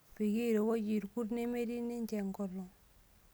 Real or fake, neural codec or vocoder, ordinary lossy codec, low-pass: real; none; none; none